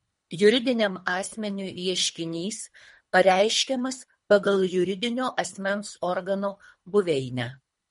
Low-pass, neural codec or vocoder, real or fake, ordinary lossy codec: 10.8 kHz; codec, 24 kHz, 3 kbps, HILCodec; fake; MP3, 48 kbps